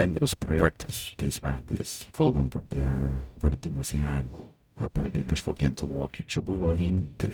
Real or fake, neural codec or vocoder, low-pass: fake; codec, 44.1 kHz, 0.9 kbps, DAC; 19.8 kHz